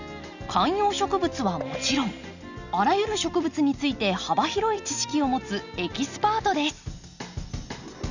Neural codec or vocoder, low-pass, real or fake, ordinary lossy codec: none; 7.2 kHz; real; none